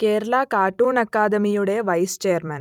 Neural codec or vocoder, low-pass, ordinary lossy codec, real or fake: vocoder, 44.1 kHz, 128 mel bands every 256 samples, BigVGAN v2; 19.8 kHz; none; fake